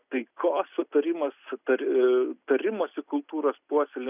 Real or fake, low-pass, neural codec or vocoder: real; 3.6 kHz; none